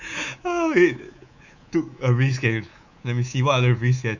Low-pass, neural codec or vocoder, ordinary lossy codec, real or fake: 7.2 kHz; codec, 24 kHz, 3.1 kbps, DualCodec; none; fake